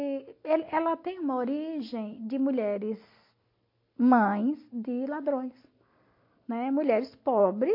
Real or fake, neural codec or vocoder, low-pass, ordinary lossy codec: real; none; 5.4 kHz; MP3, 32 kbps